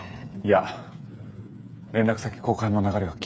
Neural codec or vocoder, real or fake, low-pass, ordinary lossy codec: codec, 16 kHz, 8 kbps, FreqCodec, smaller model; fake; none; none